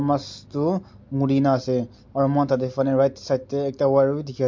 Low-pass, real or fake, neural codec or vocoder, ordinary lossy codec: 7.2 kHz; real; none; MP3, 48 kbps